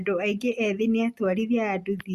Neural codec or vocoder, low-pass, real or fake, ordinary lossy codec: none; 14.4 kHz; real; Opus, 64 kbps